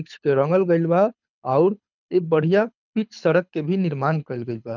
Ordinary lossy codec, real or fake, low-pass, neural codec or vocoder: none; fake; 7.2 kHz; codec, 24 kHz, 6 kbps, HILCodec